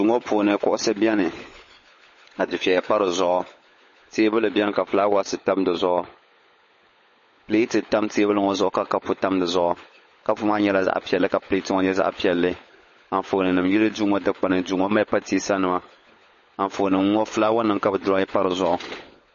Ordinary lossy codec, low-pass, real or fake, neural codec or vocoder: MP3, 32 kbps; 7.2 kHz; fake; codec, 16 kHz, 16 kbps, FunCodec, trained on LibriTTS, 50 frames a second